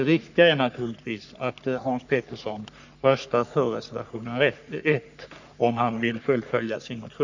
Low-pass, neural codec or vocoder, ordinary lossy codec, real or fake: 7.2 kHz; codec, 44.1 kHz, 3.4 kbps, Pupu-Codec; none; fake